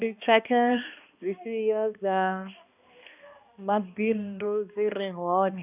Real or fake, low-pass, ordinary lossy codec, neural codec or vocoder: fake; 3.6 kHz; none; codec, 16 kHz, 1 kbps, X-Codec, HuBERT features, trained on balanced general audio